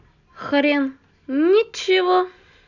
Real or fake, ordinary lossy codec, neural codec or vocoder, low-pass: real; none; none; 7.2 kHz